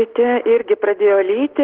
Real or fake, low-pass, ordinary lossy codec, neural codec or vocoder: real; 5.4 kHz; Opus, 16 kbps; none